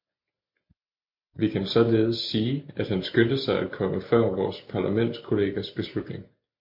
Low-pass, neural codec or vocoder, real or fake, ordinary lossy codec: 5.4 kHz; none; real; MP3, 32 kbps